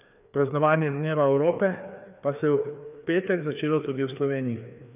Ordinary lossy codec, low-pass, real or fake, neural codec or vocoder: none; 3.6 kHz; fake; codec, 16 kHz, 2 kbps, FreqCodec, larger model